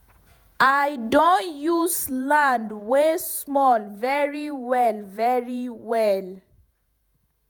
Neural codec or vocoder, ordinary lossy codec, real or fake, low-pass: vocoder, 48 kHz, 128 mel bands, Vocos; none; fake; none